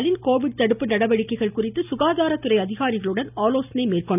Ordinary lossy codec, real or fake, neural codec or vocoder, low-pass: none; real; none; 3.6 kHz